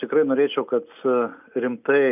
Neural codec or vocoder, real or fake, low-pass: none; real; 3.6 kHz